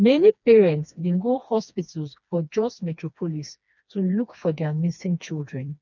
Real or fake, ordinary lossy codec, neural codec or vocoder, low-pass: fake; none; codec, 16 kHz, 2 kbps, FreqCodec, smaller model; 7.2 kHz